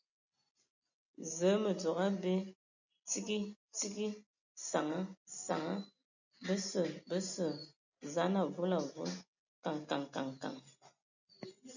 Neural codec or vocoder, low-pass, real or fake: none; 7.2 kHz; real